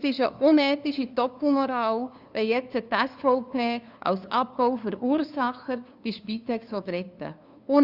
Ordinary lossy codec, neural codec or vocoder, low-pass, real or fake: Opus, 64 kbps; codec, 16 kHz, 2 kbps, FunCodec, trained on LibriTTS, 25 frames a second; 5.4 kHz; fake